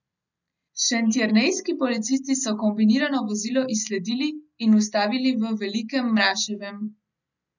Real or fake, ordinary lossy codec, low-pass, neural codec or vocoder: real; none; 7.2 kHz; none